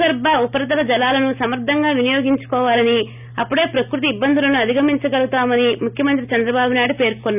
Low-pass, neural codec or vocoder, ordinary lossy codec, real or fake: 3.6 kHz; none; none; real